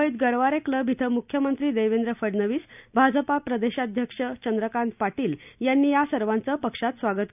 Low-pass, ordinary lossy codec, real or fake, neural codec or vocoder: 3.6 kHz; none; real; none